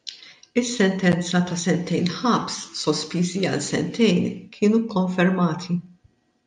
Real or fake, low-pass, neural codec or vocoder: real; 9.9 kHz; none